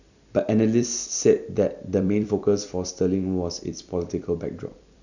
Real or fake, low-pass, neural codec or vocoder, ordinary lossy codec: fake; 7.2 kHz; vocoder, 44.1 kHz, 128 mel bands every 256 samples, BigVGAN v2; none